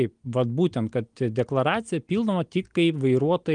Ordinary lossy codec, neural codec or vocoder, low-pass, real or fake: Opus, 24 kbps; none; 9.9 kHz; real